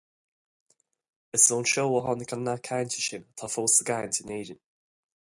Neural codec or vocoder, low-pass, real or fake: none; 10.8 kHz; real